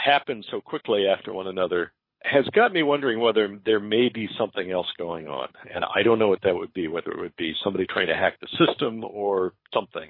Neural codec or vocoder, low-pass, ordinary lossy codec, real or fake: codec, 24 kHz, 6 kbps, HILCodec; 5.4 kHz; MP3, 24 kbps; fake